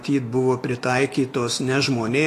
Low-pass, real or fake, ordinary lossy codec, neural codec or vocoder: 14.4 kHz; fake; AAC, 48 kbps; autoencoder, 48 kHz, 128 numbers a frame, DAC-VAE, trained on Japanese speech